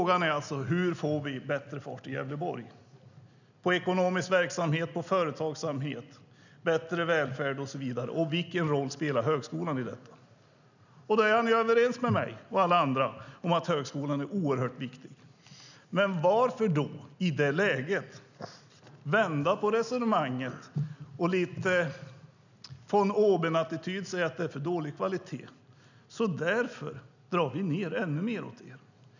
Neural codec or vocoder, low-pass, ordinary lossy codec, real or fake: none; 7.2 kHz; none; real